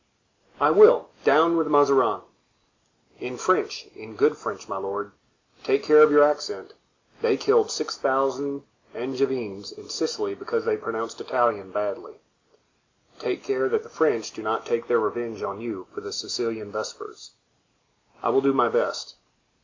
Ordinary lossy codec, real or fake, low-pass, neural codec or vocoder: MP3, 64 kbps; real; 7.2 kHz; none